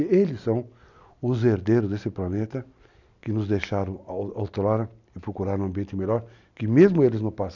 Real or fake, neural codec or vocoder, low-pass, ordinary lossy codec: real; none; 7.2 kHz; none